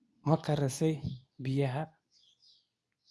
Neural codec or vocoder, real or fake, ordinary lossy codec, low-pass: codec, 24 kHz, 0.9 kbps, WavTokenizer, medium speech release version 2; fake; none; none